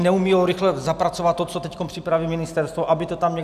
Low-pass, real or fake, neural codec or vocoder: 14.4 kHz; real; none